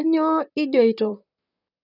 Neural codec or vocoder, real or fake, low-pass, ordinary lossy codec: vocoder, 44.1 kHz, 128 mel bands, Pupu-Vocoder; fake; 5.4 kHz; none